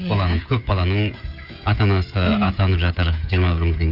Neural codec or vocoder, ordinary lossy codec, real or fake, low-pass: codec, 16 kHz, 16 kbps, FreqCodec, smaller model; Opus, 64 kbps; fake; 5.4 kHz